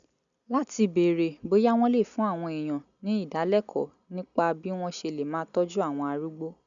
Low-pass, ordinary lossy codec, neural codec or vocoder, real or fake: 7.2 kHz; none; none; real